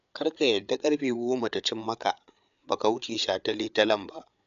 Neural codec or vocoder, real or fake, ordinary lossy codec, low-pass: codec, 16 kHz, 8 kbps, FreqCodec, larger model; fake; MP3, 96 kbps; 7.2 kHz